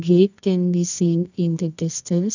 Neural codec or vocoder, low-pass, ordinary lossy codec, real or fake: codec, 24 kHz, 0.9 kbps, WavTokenizer, medium music audio release; 7.2 kHz; none; fake